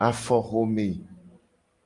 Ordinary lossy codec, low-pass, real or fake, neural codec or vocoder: Opus, 32 kbps; 10.8 kHz; real; none